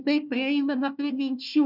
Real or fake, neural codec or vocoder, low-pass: fake; codec, 16 kHz, 1 kbps, FunCodec, trained on Chinese and English, 50 frames a second; 5.4 kHz